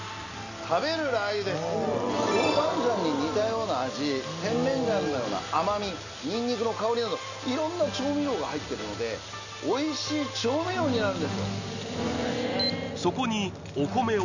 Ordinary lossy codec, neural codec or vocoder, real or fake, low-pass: none; none; real; 7.2 kHz